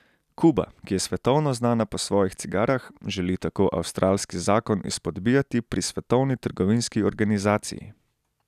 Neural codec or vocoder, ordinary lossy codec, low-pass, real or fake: none; none; 14.4 kHz; real